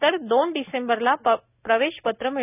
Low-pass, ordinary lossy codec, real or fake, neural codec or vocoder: 3.6 kHz; none; real; none